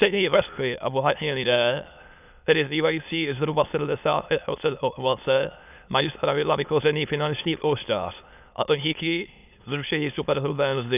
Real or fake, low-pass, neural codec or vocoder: fake; 3.6 kHz; autoencoder, 22.05 kHz, a latent of 192 numbers a frame, VITS, trained on many speakers